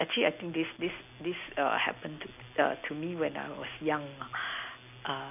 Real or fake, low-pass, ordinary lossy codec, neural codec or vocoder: real; 3.6 kHz; none; none